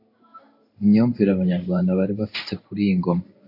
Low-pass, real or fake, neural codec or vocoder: 5.4 kHz; fake; codec, 16 kHz in and 24 kHz out, 1 kbps, XY-Tokenizer